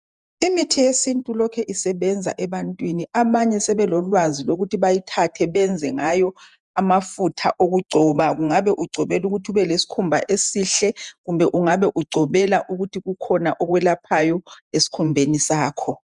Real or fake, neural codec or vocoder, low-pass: fake; vocoder, 48 kHz, 128 mel bands, Vocos; 10.8 kHz